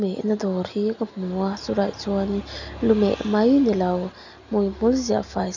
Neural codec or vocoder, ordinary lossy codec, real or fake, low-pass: none; none; real; 7.2 kHz